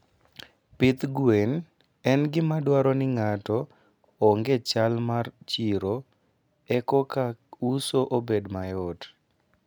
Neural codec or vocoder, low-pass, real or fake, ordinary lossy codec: none; none; real; none